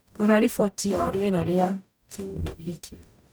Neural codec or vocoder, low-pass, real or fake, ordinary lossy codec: codec, 44.1 kHz, 0.9 kbps, DAC; none; fake; none